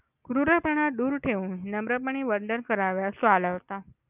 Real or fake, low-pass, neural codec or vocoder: real; 3.6 kHz; none